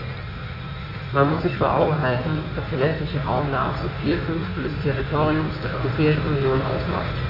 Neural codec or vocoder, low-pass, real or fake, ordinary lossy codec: codec, 16 kHz in and 24 kHz out, 1.1 kbps, FireRedTTS-2 codec; 5.4 kHz; fake; none